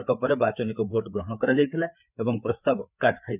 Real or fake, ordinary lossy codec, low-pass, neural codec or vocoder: fake; none; 3.6 kHz; codec, 16 kHz, 4 kbps, FreqCodec, larger model